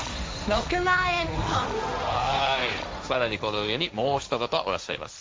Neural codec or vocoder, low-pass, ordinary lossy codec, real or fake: codec, 16 kHz, 1.1 kbps, Voila-Tokenizer; none; none; fake